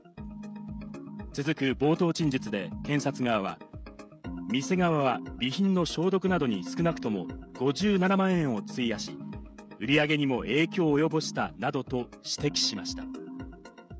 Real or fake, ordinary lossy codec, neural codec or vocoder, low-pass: fake; none; codec, 16 kHz, 16 kbps, FreqCodec, smaller model; none